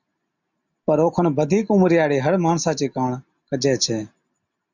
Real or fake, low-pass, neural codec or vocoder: real; 7.2 kHz; none